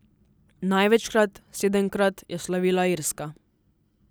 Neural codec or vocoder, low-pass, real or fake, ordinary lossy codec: none; none; real; none